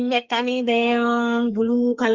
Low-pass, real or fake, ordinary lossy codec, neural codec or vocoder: 7.2 kHz; fake; Opus, 32 kbps; codec, 44.1 kHz, 2.6 kbps, SNAC